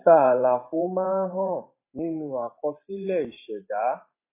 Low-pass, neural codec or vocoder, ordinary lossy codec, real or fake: 3.6 kHz; vocoder, 44.1 kHz, 128 mel bands every 512 samples, BigVGAN v2; AAC, 24 kbps; fake